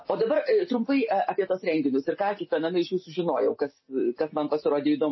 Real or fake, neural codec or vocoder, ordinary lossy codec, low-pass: real; none; MP3, 24 kbps; 7.2 kHz